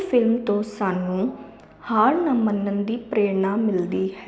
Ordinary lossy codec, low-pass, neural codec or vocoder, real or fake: none; none; none; real